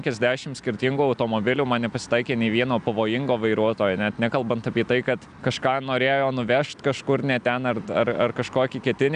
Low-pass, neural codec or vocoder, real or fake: 9.9 kHz; none; real